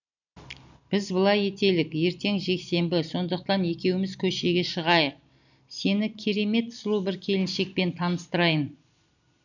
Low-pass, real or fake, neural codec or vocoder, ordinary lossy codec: 7.2 kHz; real; none; none